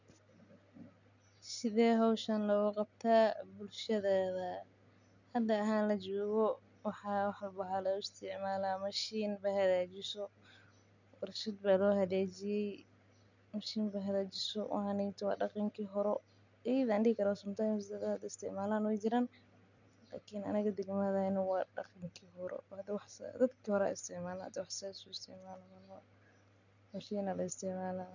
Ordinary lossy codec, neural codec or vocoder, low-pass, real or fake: none; none; 7.2 kHz; real